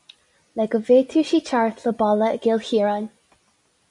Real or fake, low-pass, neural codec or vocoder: real; 10.8 kHz; none